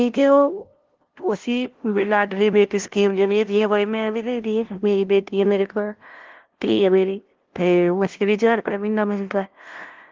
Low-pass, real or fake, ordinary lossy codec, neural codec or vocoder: 7.2 kHz; fake; Opus, 16 kbps; codec, 16 kHz, 0.5 kbps, FunCodec, trained on LibriTTS, 25 frames a second